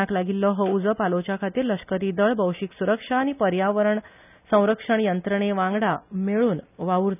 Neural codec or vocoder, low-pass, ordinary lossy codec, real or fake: none; 3.6 kHz; none; real